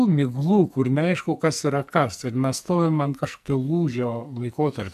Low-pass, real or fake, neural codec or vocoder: 14.4 kHz; fake; codec, 44.1 kHz, 2.6 kbps, SNAC